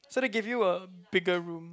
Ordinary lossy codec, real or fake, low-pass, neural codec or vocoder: none; real; none; none